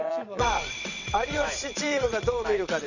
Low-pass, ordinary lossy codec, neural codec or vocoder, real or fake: 7.2 kHz; none; vocoder, 22.05 kHz, 80 mel bands, WaveNeXt; fake